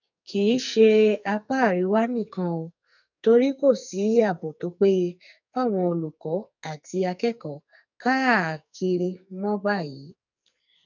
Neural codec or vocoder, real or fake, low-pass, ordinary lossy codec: codec, 44.1 kHz, 2.6 kbps, SNAC; fake; 7.2 kHz; none